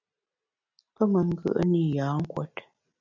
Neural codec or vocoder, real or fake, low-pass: none; real; 7.2 kHz